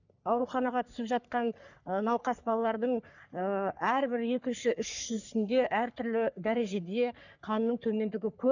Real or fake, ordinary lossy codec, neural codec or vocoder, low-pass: fake; none; codec, 44.1 kHz, 3.4 kbps, Pupu-Codec; 7.2 kHz